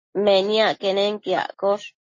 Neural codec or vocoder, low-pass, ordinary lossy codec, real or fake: none; 7.2 kHz; MP3, 32 kbps; real